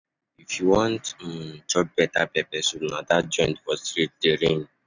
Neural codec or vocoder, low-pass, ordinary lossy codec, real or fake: none; 7.2 kHz; none; real